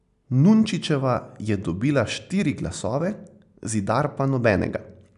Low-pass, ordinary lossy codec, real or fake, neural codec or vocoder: 10.8 kHz; none; real; none